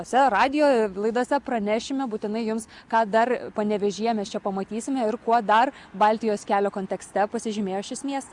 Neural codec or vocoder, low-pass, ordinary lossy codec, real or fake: none; 10.8 kHz; Opus, 64 kbps; real